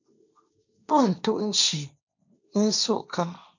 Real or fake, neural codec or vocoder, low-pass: fake; codec, 16 kHz, 1.1 kbps, Voila-Tokenizer; 7.2 kHz